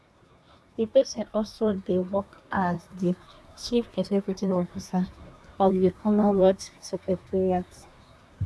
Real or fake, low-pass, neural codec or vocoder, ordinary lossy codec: fake; none; codec, 24 kHz, 1 kbps, SNAC; none